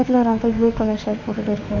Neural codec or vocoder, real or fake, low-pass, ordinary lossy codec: autoencoder, 48 kHz, 32 numbers a frame, DAC-VAE, trained on Japanese speech; fake; 7.2 kHz; none